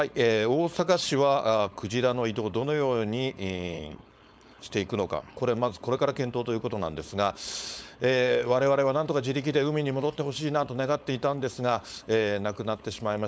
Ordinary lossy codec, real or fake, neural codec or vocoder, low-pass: none; fake; codec, 16 kHz, 4.8 kbps, FACodec; none